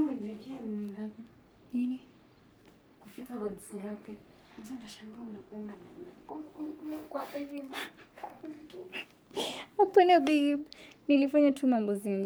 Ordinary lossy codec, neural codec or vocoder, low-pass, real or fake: none; codec, 44.1 kHz, 3.4 kbps, Pupu-Codec; none; fake